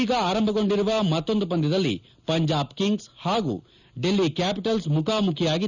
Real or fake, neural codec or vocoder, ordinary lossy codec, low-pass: real; none; none; 7.2 kHz